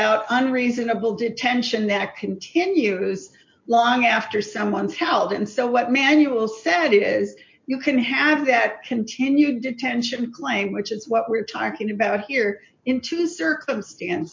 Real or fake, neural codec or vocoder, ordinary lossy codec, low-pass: real; none; MP3, 48 kbps; 7.2 kHz